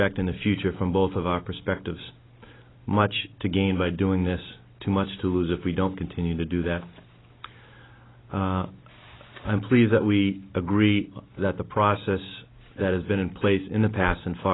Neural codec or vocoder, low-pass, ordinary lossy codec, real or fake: none; 7.2 kHz; AAC, 16 kbps; real